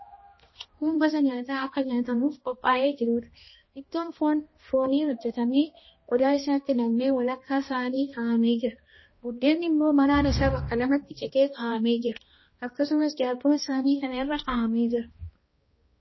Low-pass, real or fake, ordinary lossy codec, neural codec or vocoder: 7.2 kHz; fake; MP3, 24 kbps; codec, 16 kHz, 1 kbps, X-Codec, HuBERT features, trained on balanced general audio